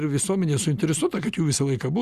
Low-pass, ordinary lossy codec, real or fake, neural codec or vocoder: 14.4 kHz; Opus, 64 kbps; real; none